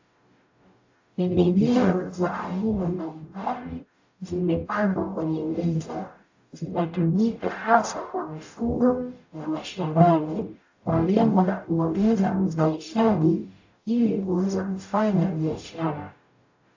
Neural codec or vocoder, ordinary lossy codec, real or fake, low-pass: codec, 44.1 kHz, 0.9 kbps, DAC; AAC, 48 kbps; fake; 7.2 kHz